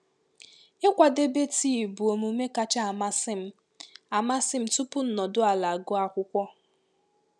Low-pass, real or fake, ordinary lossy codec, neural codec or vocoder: none; real; none; none